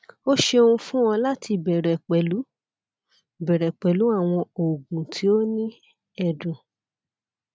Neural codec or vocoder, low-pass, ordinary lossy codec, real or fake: none; none; none; real